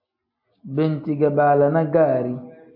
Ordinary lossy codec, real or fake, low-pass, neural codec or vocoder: MP3, 32 kbps; real; 5.4 kHz; none